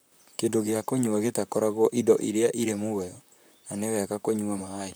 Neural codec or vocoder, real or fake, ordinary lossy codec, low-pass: vocoder, 44.1 kHz, 128 mel bands, Pupu-Vocoder; fake; none; none